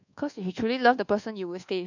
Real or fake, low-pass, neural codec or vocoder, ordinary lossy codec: fake; 7.2 kHz; codec, 24 kHz, 1.2 kbps, DualCodec; none